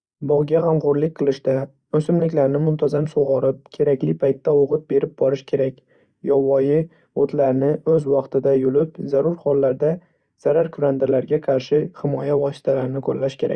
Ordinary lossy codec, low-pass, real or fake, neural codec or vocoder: Opus, 64 kbps; 9.9 kHz; fake; vocoder, 44.1 kHz, 128 mel bands every 512 samples, BigVGAN v2